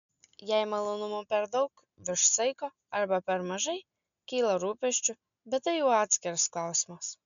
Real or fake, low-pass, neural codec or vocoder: real; 7.2 kHz; none